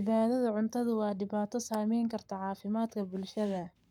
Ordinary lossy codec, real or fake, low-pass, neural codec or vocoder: none; fake; 19.8 kHz; codec, 44.1 kHz, 7.8 kbps, Pupu-Codec